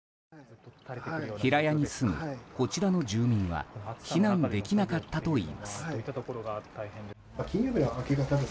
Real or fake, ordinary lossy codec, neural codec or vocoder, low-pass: real; none; none; none